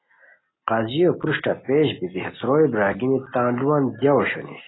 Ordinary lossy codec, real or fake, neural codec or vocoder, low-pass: AAC, 16 kbps; real; none; 7.2 kHz